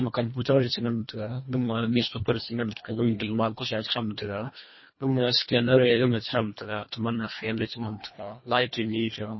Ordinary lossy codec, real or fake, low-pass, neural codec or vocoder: MP3, 24 kbps; fake; 7.2 kHz; codec, 24 kHz, 1.5 kbps, HILCodec